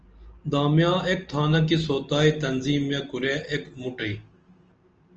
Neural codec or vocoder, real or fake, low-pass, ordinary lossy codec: none; real; 7.2 kHz; Opus, 24 kbps